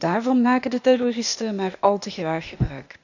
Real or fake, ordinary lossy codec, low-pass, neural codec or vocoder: fake; none; 7.2 kHz; codec, 16 kHz, 0.8 kbps, ZipCodec